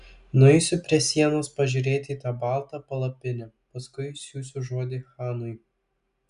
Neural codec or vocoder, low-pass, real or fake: none; 10.8 kHz; real